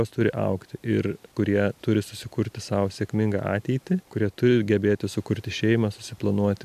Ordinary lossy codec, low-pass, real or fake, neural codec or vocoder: MP3, 96 kbps; 14.4 kHz; real; none